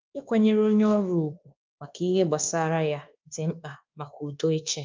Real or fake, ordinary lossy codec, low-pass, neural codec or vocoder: fake; Opus, 32 kbps; 7.2 kHz; codec, 24 kHz, 1.2 kbps, DualCodec